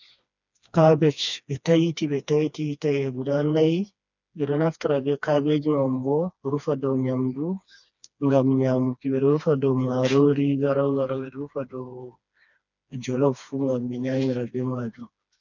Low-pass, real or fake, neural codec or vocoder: 7.2 kHz; fake; codec, 16 kHz, 2 kbps, FreqCodec, smaller model